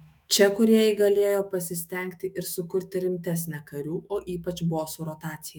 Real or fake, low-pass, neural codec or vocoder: fake; 19.8 kHz; autoencoder, 48 kHz, 128 numbers a frame, DAC-VAE, trained on Japanese speech